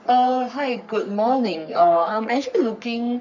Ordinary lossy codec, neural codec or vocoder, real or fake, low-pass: none; codec, 44.1 kHz, 3.4 kbps, Pupu-Codec; fake; 7.2 kHz